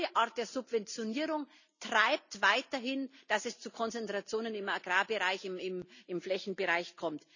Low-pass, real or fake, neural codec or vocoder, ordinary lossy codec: 7.2 kHz; real; none; none